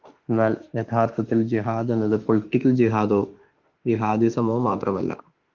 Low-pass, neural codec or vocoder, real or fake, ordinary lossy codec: 7.2 kHz; autoencoder, 48 kHz, 32 numbers a frame, DAC-VAE, trained on Japanese speech; fake; Opus, 32 kbps